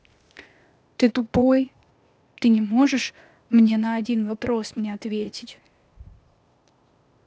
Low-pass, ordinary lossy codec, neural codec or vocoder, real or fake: none; none; codec, 16 kHz, 0.8 kbps, ZipCodec; fake